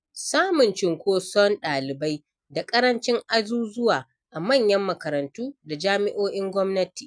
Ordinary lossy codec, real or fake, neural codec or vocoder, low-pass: none; real; none; 9.9 kHz